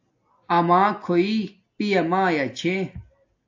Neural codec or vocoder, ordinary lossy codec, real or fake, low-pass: none; AAC, 48 kbps; real; 7.2 kHz